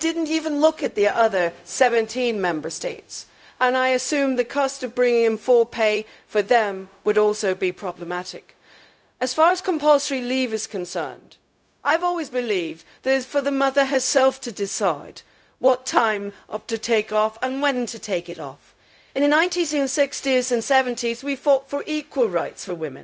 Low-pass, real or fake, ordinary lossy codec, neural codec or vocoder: none; fake; none; codec, 16 kHz, 0.4 kbps, LongCat-Audio-Codec